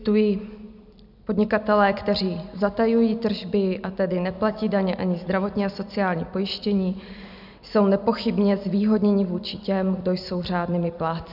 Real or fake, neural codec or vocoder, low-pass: real; none; 5.4 kHz